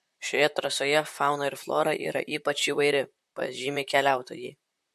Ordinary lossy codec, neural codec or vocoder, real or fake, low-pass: MP3, 64 kbps; vocoder, 44.1 kHz, 128 mel bands, Pupu-Vocoder; fake; 14.4 kHz